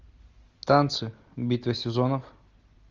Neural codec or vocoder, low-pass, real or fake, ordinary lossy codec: none; 7.2 kHz; real; Opus, 32 kbps